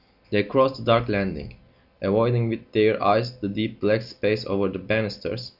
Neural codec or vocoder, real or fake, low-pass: none; real; 5.4 kHz